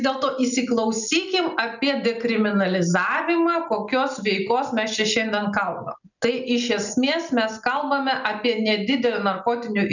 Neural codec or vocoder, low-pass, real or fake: none; 7.2 kHz; real